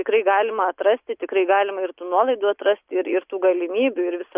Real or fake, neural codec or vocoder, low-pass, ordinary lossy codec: real; none; 3.6 kHz; Opus, 64 kbps